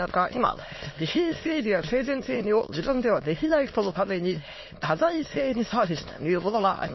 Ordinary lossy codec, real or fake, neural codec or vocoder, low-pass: MP3, 24 kbps; fake; autoencoder, 22.05 kHz, a latent of 192 numbers a frame, VITS, trained on many speakers; 7.2 kHz